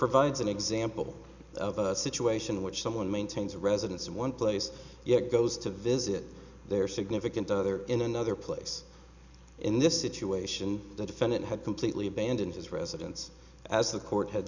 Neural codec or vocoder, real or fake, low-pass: none; real; 7.2 kHz